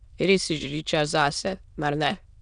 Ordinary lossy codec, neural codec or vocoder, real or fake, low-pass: none; autoencoder, 22.05 kHz, a latent of 192 numbers a frame, VITS, trained on many speakers; fake; 9.9 kHz